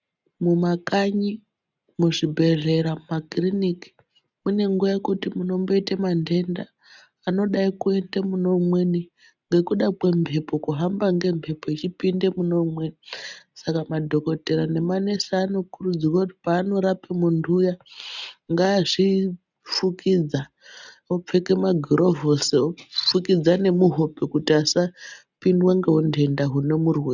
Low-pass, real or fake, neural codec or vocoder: 7.2 kHz; real; none